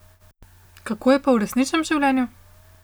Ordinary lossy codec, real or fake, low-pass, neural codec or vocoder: none; real; none; none